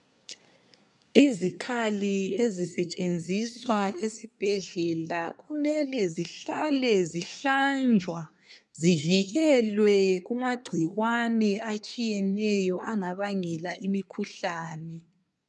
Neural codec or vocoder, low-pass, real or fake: codec, 24 kHz, 1 kbps, SNAC; 10.8 kHz; fake